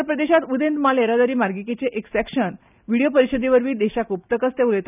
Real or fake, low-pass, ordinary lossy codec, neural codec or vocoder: real; 3.6 kHz; none; none